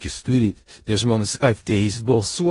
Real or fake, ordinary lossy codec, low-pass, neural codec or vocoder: fake; AAC, 32 kbps; 10.8 kHz; codec, 16 kHz in and 24 kHz out, 0.4 kbps, LongCat-Audio-Codec, four codebook decoder